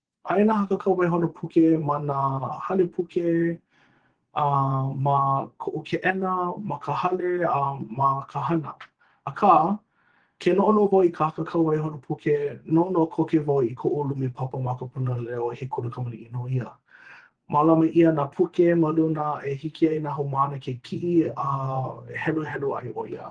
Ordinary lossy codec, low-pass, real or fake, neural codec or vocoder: Opus, 16 kbps; 9.9 kHz; fake; vocoder, 24 kHz, 100 mel bands, Vocos